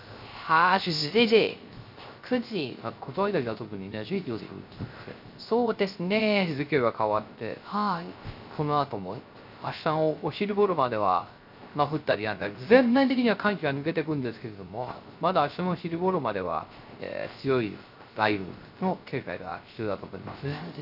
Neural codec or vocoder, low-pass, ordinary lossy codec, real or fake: codec, 16 kHz, 0.3 kbps, FocalCodec; 5.4 kHz; none; fake